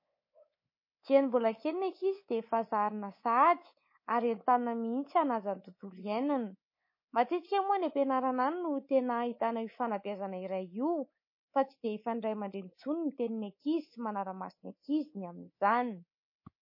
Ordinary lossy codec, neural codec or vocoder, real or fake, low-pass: MP3, 24 kbps; codec, 24 kHz, 3.1 kbps, DualCodec; fake; 5.4 kHz